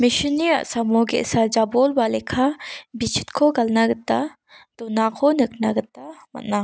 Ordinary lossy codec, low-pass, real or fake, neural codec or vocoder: none; none; real; none